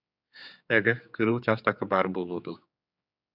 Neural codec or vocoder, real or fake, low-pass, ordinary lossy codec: codec, 16 kHz, 4 kbps, X-Codec, HuBERT features, trained on general audio; fake; 5.4 kHz; AAC, 48 kbps